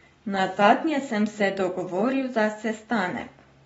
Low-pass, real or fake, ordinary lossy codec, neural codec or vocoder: 19.8 kHz; real; AAC, 24 kbps; none